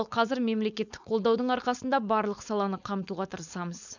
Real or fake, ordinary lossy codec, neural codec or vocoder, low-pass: fake; none; codec, 16 kHz, 4.8 kbps, FACodec; 7.2 kHz